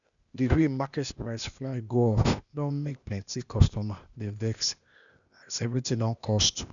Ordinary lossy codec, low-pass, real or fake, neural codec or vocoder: none; 7.2 kHz; fake; codec, 16 kHz, 0.8 kbps, ZipCodec